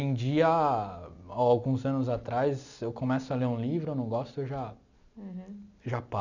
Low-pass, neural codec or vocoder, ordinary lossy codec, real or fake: 7.2 kHz; none; none; real